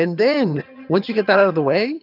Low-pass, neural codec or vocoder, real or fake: 5.4 kHz; vocoder, 22.05 kHz, 80 mel bands, HiFi-GAN; fake